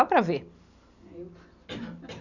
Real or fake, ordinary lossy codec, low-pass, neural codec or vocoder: real; none; 7.2 kHz; none